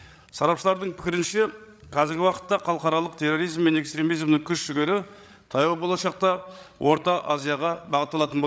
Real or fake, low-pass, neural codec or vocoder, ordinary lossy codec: fake; none; codec, 16 kHz, 8 kbps, FreqCodec, larger model; none